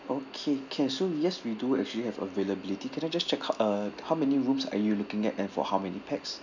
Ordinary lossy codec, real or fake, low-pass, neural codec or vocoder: none; real; 7.2 kHz; none